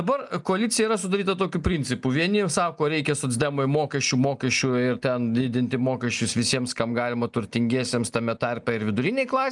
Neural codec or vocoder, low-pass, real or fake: none; 10.8 kHz; real